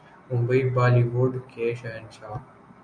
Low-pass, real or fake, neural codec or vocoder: 9.9 kHz; real; none